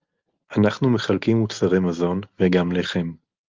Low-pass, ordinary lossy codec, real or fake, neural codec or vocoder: 7.2 kHz; Opus, 24 kbps; real; none